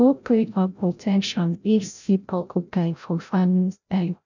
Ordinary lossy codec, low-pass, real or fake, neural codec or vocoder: none; 7.2 kHz; fake; codec, 16 kHz, 0.5 kbps, FreqCodec, larger model